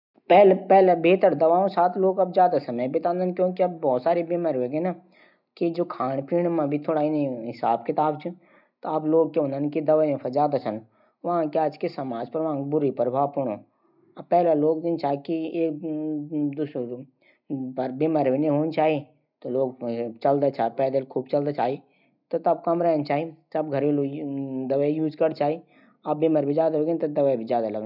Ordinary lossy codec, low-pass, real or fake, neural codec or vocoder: none; 5.4 kHz; real; none